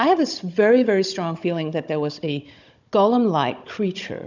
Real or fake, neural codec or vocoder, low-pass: fake; codec, 16 kHz, 16 kbps, FunCodec, trained on Chinese and English, 50 frames a second; 7.2 kHz